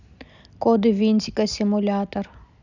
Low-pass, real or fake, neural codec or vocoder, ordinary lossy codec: 7.2 kHz; real; none; none